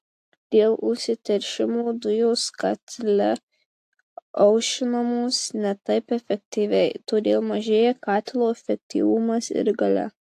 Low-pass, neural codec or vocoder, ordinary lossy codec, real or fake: 14.4 kHz; none; AAC, 64 kbps; real